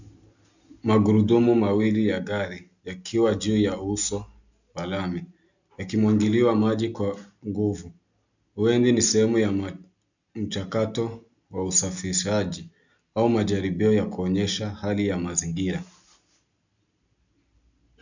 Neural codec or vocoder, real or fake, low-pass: none; real; 7.2 kHz